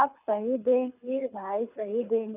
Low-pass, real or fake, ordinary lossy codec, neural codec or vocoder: 3.6 kHz; fake; none; codec, 24 kHz, 6 kbps, HILCodec